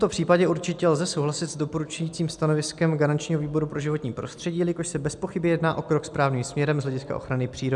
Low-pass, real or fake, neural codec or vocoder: 10.8 kHz; real; none